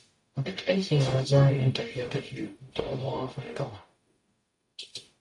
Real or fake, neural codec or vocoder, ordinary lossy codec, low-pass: fake; codec, 44.1 kHz, 0.9 kbps, DAC; MP3, 48 kbps; 10.8 kHz